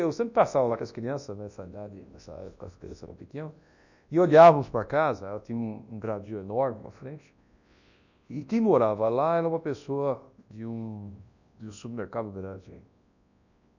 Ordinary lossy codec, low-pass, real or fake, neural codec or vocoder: none; 7.2 kHz; fake; codec, 24 kHz, 0.9 kbps, WavTokenizer, large speech release